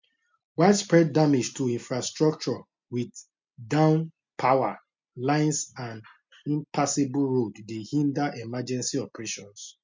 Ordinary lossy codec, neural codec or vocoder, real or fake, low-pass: MP3, 64 kbps; none; real; 7.2 kHz